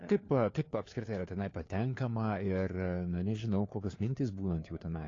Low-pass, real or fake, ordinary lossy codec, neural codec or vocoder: 7.2 kHz; fake; AAC, 32 kbps; codec, 16 kHz, 4 kbps, FunCodec, trained on LibriTTS, 50 frames a second